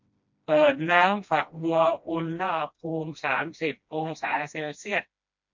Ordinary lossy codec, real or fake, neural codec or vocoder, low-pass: MP3, 48 kbps; fake; codec, 16 kHz, 1 kbps, FreqCodec, smaller model; 7.2 kHz